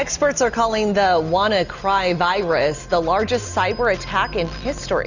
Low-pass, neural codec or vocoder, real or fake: 7.2 kHz; none; real